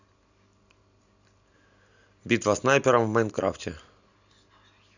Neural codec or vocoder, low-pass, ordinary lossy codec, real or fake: none; 7.2 kHz; none; real